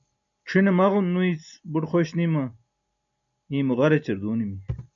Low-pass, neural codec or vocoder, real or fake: 7.2 kHz; none; real